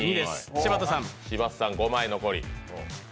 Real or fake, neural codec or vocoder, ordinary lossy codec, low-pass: real; none; none; none